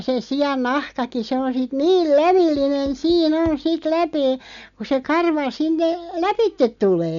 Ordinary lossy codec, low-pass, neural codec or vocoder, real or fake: none; 7.2 kHz; none; real